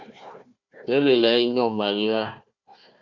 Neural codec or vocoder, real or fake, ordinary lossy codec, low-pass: codec, 16 kHz, 1 kbps, FunCodec, trained on Chinese and English, 50 frames a second; fake; Opus, 64 kbps; 7.2 kHz